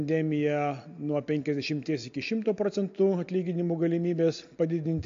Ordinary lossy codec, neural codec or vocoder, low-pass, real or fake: AAC, 48 kbps; none; 7.2 kHz; real